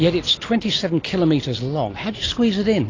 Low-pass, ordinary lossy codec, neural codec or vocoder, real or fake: 7.2 kHz; AAC, 32 kbps; none; real